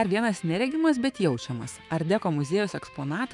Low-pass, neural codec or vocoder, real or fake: 10.8 kHz; autoencoder, 48 kHz, 128 numbers a frame, DAC-VAE, trained on Japanese speech; fake